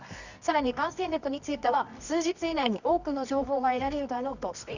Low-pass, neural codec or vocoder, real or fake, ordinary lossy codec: 7.2 kHz; codec, 24 kHz, 0.9 kbps, WavTokenizer, medium music audio release; fake; none